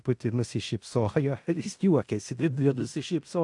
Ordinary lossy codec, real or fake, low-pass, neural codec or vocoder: AAC, 64 kbps; fake; 10.8 kHz; codec, 16 kHz in and 24 kHz out, 0.9 kbps, LongCat-Audio-Codec, fine tuned four codebook decoder